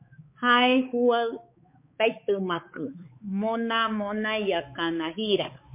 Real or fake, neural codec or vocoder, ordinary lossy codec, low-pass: fake; codec, 16 kHz, 4 kbps, X-Codec, HuBERT features, trained on balanced general audio; MP3, 24 kbps; 3.6 kHz